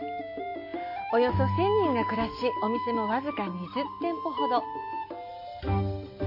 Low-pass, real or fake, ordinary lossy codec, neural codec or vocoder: 5.4 kHz; real; MP3, 32 kbps; none